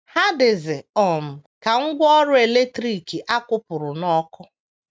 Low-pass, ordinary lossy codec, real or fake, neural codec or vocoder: none; none; real; none